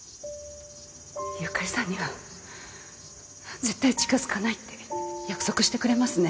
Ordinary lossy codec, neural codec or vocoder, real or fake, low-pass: none; none; real; none